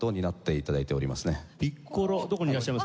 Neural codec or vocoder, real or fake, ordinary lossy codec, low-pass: none; real; none; none